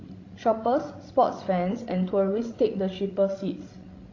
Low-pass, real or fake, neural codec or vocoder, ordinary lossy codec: 7.2 kHz; fake; codec, 16 kHz, 16 kbps, FreqCodec, larger model; AAC, 32 kbps